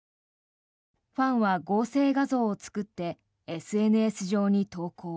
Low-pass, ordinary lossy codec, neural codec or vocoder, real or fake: none; none; none; real